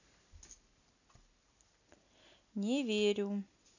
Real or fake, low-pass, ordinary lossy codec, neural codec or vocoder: real; 7.2 kHz; none; none